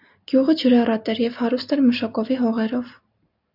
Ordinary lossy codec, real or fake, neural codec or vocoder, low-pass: AAC, 48 kbps; real; none; 5.4 kHz